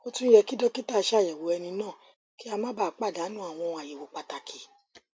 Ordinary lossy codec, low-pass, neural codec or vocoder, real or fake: none; none; none; real